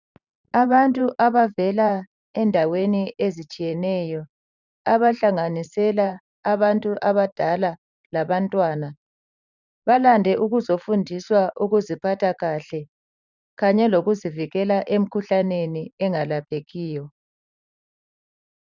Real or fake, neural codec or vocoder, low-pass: fake; vocoder, 44.1 kHz, 128 mel bands every 512 samples, BigVGAN v2; 7.2 kHz